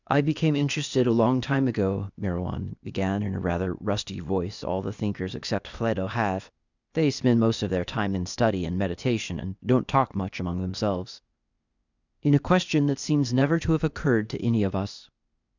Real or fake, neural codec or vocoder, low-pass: fake; codec, 16 kHz, 0.8 kbps, ZipCodec; 7.2 kHz